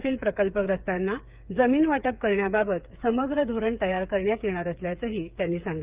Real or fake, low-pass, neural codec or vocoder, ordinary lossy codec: fake; 3.6 kHz; codec, 16 kHz, 8 kbps, FreqCodec, smaller model; Opus, 24 kbps